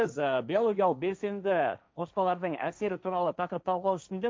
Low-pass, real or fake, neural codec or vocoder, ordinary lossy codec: none; fake; codec, 16 kHz, 1.1 kbps, Voila-Tokenizer; none